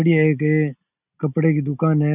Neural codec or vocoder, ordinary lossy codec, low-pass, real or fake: none; none; 3.6 kHz; real